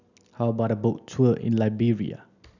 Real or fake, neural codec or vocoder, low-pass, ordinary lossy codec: real; none; 7.2 kHz; none